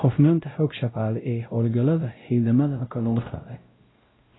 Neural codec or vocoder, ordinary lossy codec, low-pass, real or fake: codec, 16 kHz, 0.5 kbps, X-Codec, WavLM features, trained on Multilingual LibriSpeech; AAC, 16 kbps; 7.2 kHz; fake